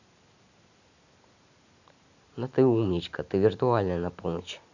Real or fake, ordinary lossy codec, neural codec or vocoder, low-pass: real; none; none; 7.2 kHz